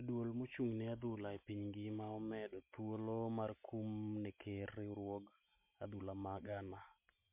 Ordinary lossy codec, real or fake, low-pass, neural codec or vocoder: MP3, 32 kbps; real; 3.6 kHz; none